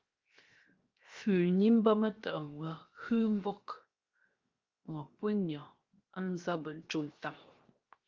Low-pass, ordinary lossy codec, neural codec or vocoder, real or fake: 7.2 kHz; Opus, 32 kbps; codec, 16 kHz, 0.7 kbps, FocalCodec; fake